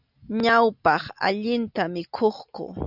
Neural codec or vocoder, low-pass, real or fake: none; 5.4 kHz; real